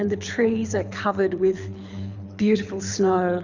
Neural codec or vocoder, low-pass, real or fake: codec, 24 kHz, 6 kbps, HILCodec; 7.2 kHz; fake